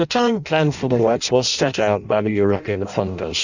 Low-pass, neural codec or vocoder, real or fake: 7.2 kHz; codec, 16 kHz in and 24 kHz out, 0.6 kbps, FireRedTTS-2 codec; fake